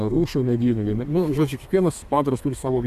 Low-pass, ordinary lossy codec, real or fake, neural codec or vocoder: 14.4 kHz; AAC, 96 kbps; fake; codec, 32 kHz, 1.9 kbps, SNAC